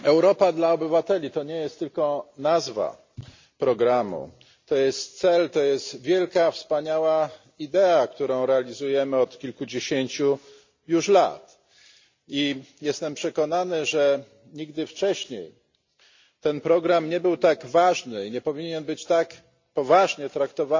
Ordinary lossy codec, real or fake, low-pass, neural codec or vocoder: MP3, 64 kbps; real; 7.2 kHz; none